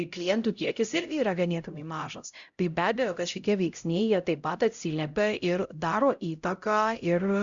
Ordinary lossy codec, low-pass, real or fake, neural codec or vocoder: Opus, 64 kbps; 7.2 kHz; fake; codec, 16 kHz, 0.5 kbps, X-Codec, HuBERT features, trained on LibriSpeech